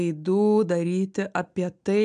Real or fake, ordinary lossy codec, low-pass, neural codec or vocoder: real; AAC, 96 kbps; 9.9 kHz; none